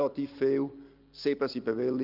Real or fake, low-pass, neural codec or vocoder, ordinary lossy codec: real; 5.4 kHz; none; Opus, 24 kbps